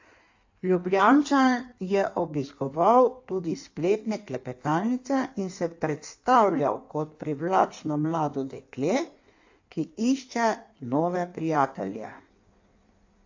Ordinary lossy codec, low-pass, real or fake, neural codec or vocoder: AAC, 48 kbps; 7.2 kHz; fake; codec, 16 kHz in and 24 kHz out, 1.1 kbps, FireRedTTS-2 codec